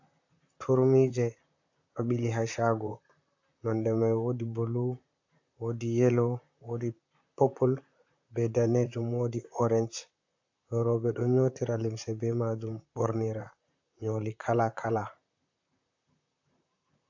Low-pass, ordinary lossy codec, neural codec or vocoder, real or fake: 7.2 kHz; AAC, 48 kbps; vocoder, 44.1 kHz, 128 mel bands, Pupu-Vocoder; fake